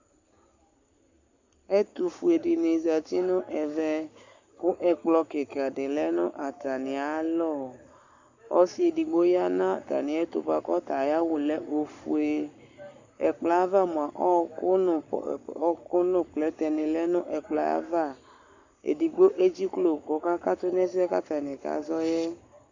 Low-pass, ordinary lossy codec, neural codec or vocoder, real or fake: 7.2 kHz; Opus, 64 kbps; codec, 44.1 kHz, 7.8 kbps, Pupu-Codec; fake